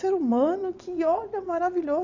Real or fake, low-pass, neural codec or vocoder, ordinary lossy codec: fake; 7.2 kHz; vocoder, 22.05 kHz, 80 mel bands, WaveNeXt; none